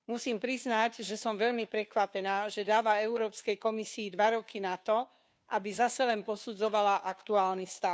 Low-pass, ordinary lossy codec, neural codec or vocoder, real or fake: none; none; codec, 16 kHz, 2 kbps, FunCodec, trained on Chinese and English, 25 frames a second; fake